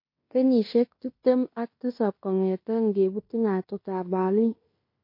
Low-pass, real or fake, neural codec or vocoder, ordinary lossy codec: 5.4 kHz; fake; codec, 16 kHz in and 24 kHz out, 0.9 kbps, LongCat-Audio-Codec, fine tuned four codebook decoder; MP3, 32 kbps